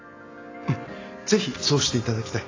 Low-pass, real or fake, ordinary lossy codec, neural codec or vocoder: 7.2 kHz; real; AAC, 32 kbps; none